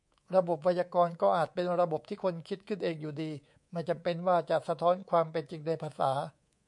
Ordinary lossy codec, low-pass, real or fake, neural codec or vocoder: MP3, 64 kbps; 10.8 kHz; fake; codec, 24 kHz, 3.1 kbps, DualCodec